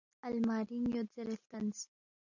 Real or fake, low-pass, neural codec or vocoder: real; 7.2 kHz; none